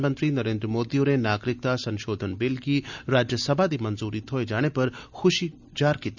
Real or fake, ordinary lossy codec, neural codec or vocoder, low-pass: real; none; none; 7.2 kHz